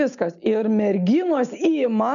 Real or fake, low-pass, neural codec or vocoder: real; 7.2 kHz; none